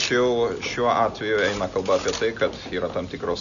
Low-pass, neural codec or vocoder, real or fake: 7.2 kHz; none; real